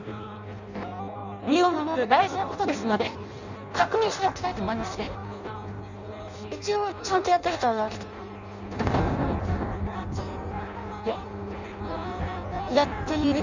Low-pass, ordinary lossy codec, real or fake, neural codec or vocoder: 7.2 kHz; none; fake; codec, 16 kHz in and 24 kHz out, 0.6 kbps, FireRedTTS-2 codec